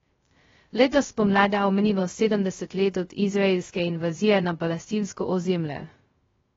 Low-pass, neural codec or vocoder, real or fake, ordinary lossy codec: 7.2 kHz; codec, 16 kHz, 0.2 kbps, FocalCodec; fake; AAC, 24 kbps